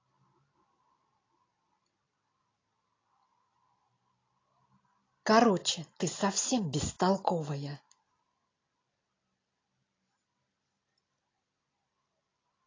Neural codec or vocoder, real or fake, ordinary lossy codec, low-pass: none; real; AAC, 32 kbps; 7.2 kHz